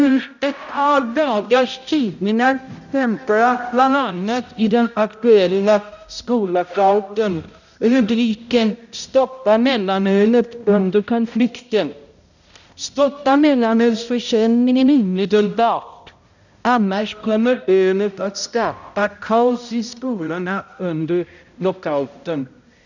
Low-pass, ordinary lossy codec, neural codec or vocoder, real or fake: 7.2 kHz; none; codec, 16 kHz, 0.5 kbps, X-Codec, HuBERT features, trained on balanced general audio; fake